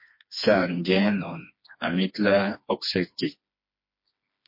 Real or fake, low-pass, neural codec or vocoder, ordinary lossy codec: fake; 5.4 kHz; codec, 16 kHz, 2 kbps, FreqCodec, smaller model; MP3, 32 kbps